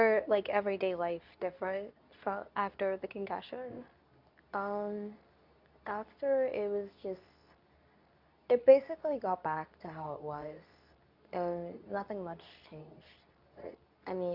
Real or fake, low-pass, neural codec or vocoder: fake; 5.4 kHz; codec, 24 kHz, 0.9 kbps, WavTokenizer, medium speech release version 2